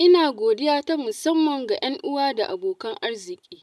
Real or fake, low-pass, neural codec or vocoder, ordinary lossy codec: real; none; none; none